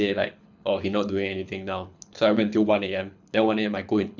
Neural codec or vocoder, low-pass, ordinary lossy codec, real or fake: codec, 24 kHz, 6 kbps, HILCodec; 7.2 kHz; none; fake